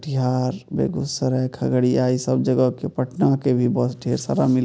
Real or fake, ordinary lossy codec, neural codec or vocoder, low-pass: real; none; none; none